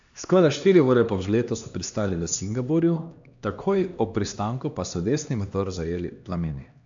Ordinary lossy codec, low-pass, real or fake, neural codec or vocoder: AAC, 64 kbps; 7.2 kHz; fake; codec, 16 kHz, 2 kbps, X-Codec, HuBERT features, trained on LibriSpeech